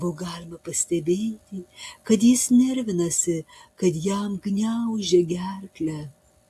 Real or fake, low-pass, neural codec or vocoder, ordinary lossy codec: real; 14.4 kHz; none; AAC, 64 kbps